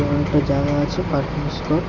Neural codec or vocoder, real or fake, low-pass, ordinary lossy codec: none; real; 7.2 kHz; Opus, 64 kbps